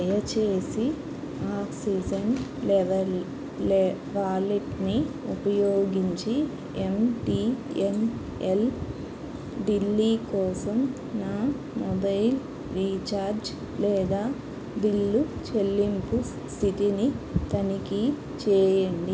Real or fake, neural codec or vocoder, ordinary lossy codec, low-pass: real; none; none; none